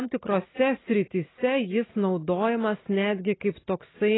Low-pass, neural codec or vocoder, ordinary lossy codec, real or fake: 7.2 kHz; none; AAC, 16 kbps; real